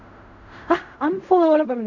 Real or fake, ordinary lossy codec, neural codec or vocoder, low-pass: fake; none; codec, 16 kHz in and 24 kHz out, 0.4 kbps, LongCat-Audio-Codec, fine tuned four codebook decoder; 7.2 kHz